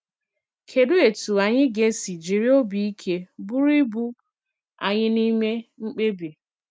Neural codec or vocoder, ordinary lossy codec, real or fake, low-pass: none; none; real; none